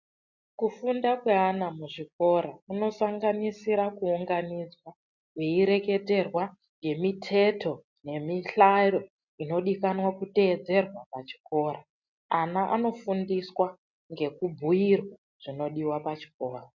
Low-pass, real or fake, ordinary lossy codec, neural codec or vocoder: 7.2 kHz; real; MP3, 64 kbps; none